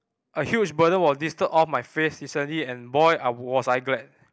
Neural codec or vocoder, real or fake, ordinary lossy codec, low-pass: none; real; none; none